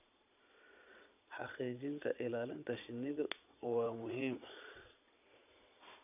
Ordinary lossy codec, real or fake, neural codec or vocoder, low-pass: none; fake; vocoder, 22.05 kHz, 80 mel bands, Vocos; 3.6 kHz